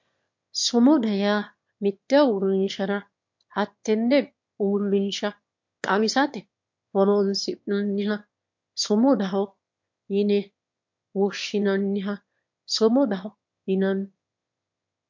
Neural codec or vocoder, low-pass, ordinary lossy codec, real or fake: autoencoder, 22.05 kHz, a latent of 192 numbers a frame, VITS, trained on one speaker; 7.2 kHz; MP3, 48 kbps; fake